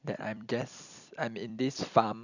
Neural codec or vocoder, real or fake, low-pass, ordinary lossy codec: vocoder, 44.1 kHz, 128 mel bands every 256 samples, BigVGAN v2; fake; 7.2 kHz; none